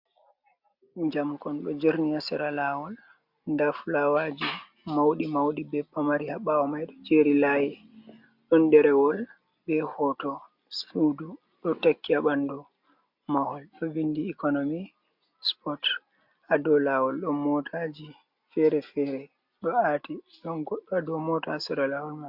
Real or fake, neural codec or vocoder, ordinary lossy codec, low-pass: real; none; MP3, 48 kbps; 5.4 kHz